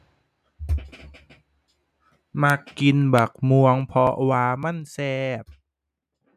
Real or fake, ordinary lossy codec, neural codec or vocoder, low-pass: real; MP3, 96 kbps; none; 14.4 kHz